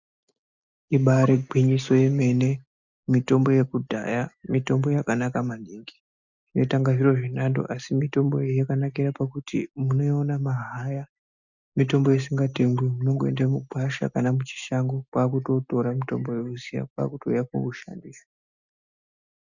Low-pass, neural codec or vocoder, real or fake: 7.2 kHz; none; real